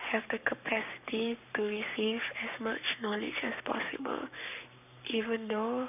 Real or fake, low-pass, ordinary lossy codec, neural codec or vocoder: fake; 3.6 kHz; none; codec, 44.1 kHz, 7.8 kbps, Pupu-Codec